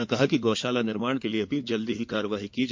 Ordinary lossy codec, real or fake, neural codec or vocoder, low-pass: MP3, 48 kbps; fake; codec, 16 kHz in and 24 kHz out, 2.2 kbps, FireRedTTS-2 codec; 7.2 kHz